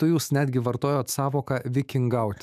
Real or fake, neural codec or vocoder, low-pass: fake; autoencoder, 48 kHz, 128 numbers a frame, DAC-VAE, trained on Japanese speech; 14.4 kHz